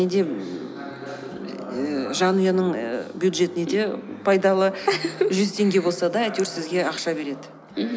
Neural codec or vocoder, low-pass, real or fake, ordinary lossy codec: none; none; real; none